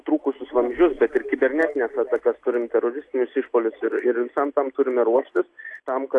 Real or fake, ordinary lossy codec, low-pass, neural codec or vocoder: real; AAC, 48 kbps; 10.8 kHz; none